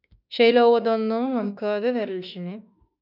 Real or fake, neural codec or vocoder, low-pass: fake; autoencoder, 48 kHz, 32 numbers a frame, DAC-VAE, trained on Japanese speech; 5.4 kHz